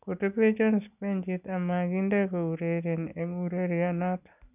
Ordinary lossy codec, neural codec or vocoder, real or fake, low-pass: none; none; real; 3.6 kHz